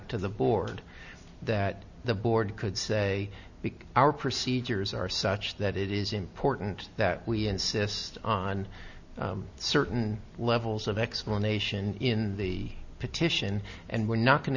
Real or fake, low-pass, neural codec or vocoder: real; 7.2 kHz; none